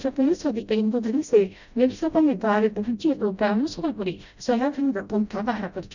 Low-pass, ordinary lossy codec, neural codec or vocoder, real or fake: 7.2 kHz; none; codec, 16 kHz, 0.5 kbps, FreqCodec, smaller model; fake